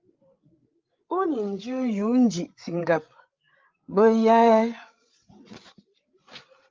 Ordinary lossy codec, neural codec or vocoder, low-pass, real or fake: Opus, 32 kbps; codec, 16 kHz, 8 kbps, FreqCodec, larger model; 7.2 kHz; fake